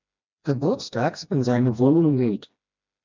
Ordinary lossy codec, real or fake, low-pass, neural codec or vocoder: MP3, 64 kbps; fake; 7.2 kHz; codec, 16 kHz, 1 kbps, FreqCodec, smaller model